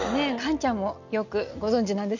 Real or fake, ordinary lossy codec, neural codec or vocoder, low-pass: real; none; none; 7.2 kHz